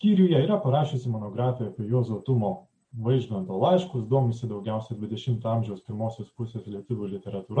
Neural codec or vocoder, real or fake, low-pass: none; real; 9.9 kHz